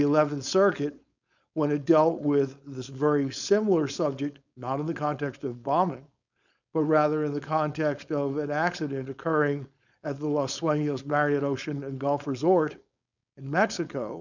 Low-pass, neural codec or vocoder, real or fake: 7.2 kHz; codec, 16 kHz, 4.8 kbps, FACodec; fake